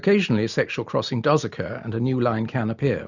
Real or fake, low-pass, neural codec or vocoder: real; 7.2 kHz; none